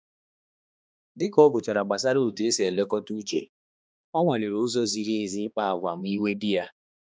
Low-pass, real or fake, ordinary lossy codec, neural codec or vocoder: none; fake; none; codec, 16 kHz, 2 kbps, X-Codec, HuBERT features, trained on balanced general audio